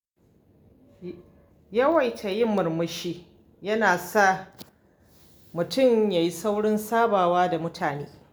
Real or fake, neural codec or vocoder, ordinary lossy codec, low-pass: real; none; none; none